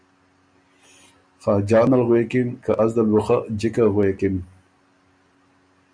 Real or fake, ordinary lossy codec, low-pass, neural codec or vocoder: real; MP3, 96 kbps; 9.9 kHz; none